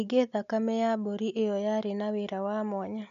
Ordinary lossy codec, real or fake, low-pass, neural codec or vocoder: none; real; 7.2 kHz; none